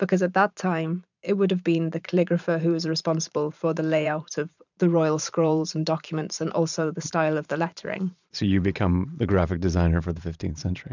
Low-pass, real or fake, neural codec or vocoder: 7.2 kHz; fake; vocoder, 44.1 kHz, 128 mel bands every 512 samples, BigVGAN v2